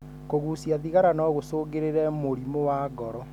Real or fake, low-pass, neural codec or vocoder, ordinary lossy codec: real; 19.8 kHz; none; none